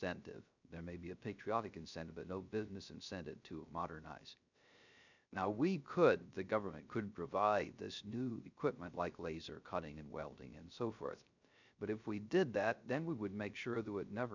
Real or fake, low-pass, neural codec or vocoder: fake; 7.2 kHz; codec, 16 kHz, 0.3 kbps, FocalCodec